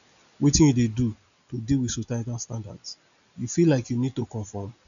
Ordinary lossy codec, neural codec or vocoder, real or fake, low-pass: none; none; real; 7.2 kHz